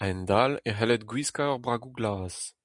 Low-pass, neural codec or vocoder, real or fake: 10.8 kHz; none; real